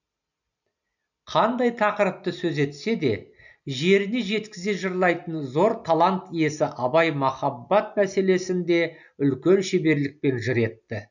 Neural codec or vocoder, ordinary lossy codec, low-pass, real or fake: none; none; 7.2 kHz; real